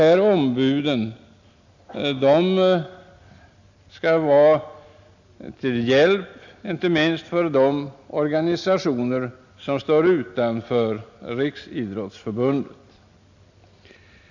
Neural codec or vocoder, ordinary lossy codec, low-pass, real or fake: none; none; 7.2 kHz; real